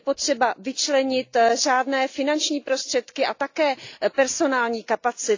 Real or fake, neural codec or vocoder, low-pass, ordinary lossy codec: real; none; 7.2 kHz; AAC, 48 kbps